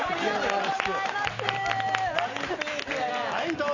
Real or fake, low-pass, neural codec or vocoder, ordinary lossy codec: real; 7.2 kHz; none; Opus, 64 kbps